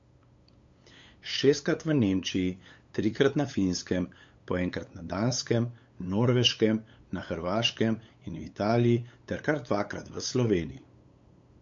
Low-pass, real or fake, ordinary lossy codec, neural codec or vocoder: 7.2 kHz; fake; MP3, 48 kbps; codec, 16 kHz, 8 kbps, FunCodec, trained on LibriTTS, 25 frames a second